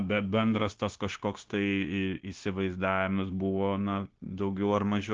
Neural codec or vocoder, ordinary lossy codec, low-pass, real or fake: codec, 16 kHz, 0.9 kbps, LongCat-Audio-Codec; Opus, 16 kbps; 7.2 kHz; fake